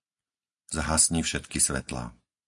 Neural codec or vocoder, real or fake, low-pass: none; real; 10.8 kHz